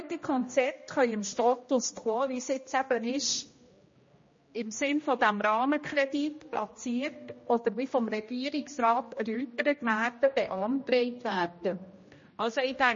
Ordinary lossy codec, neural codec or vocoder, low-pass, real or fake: MP3, 32 kbps; codec, 16 kHz, 1 kbps, X-Codec, HuBERT features, trained on general audio; 7.2 kHz; fake